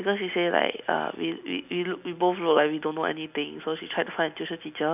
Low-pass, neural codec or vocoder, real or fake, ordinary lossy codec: 3.6 kHz; none; real; none